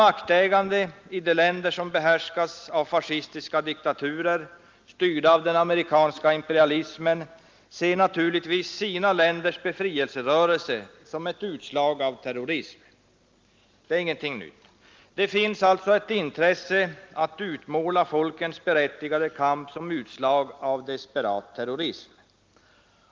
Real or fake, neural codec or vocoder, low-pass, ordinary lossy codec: real; none; 7.2 kHz; Opus, 32 kbps